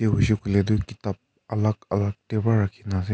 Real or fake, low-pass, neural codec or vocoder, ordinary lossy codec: real; none; none; none